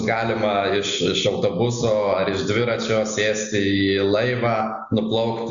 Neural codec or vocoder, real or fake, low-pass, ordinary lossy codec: none; real; 7.2 kHz; Opus, 64 kbps